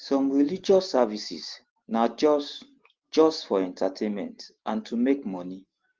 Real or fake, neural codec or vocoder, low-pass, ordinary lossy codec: real; none; 7.2 kHz; Opus, 16 kbps